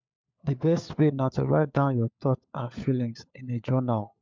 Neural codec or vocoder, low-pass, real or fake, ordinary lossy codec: codec, 16 kHz, 4 kbps, FunCodec, trained on LibriTTS, 50 frames a second; 7.2 kHz; fake; MP3, 64 kbps